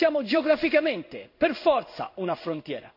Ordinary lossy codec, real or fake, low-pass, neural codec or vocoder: none; fake; 5.4 kHz; codec, 16 kHz in and 24 kHz out, 1 kbps, XY-Tokenizer